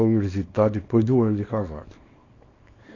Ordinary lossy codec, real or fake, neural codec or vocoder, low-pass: AAC, 32 kbps; fake; codec, 24 kHz, 0.9 kbps, WavTokenizer, small release; 7.2 kHz